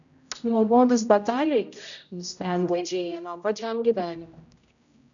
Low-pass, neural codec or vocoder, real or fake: 7.2 kHz; codec, 16 kHz, 0.5 kbps, X-Codec, HuBERT features, trained on general audio; fake